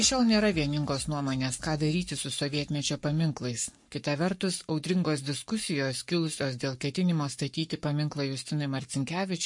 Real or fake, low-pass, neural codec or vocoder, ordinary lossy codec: fake; 10.8 kHz; codec, 44.1 kHz, 7.8 kbps, Pupu-Codec; MP3, 48 kbps